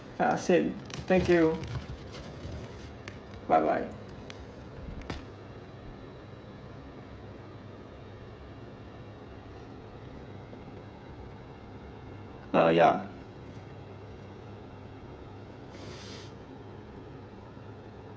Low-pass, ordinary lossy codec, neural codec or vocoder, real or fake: none; none; codec, 16 kHz, 16 kbps, FreqCodec, smaller model; fake